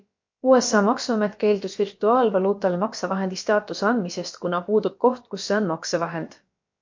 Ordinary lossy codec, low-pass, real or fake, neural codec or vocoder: MP3, 48 kbps; 7.2 kHz; fake; codec, 16 kHz, about 1 kbps, DyCAST, with the encoder's durations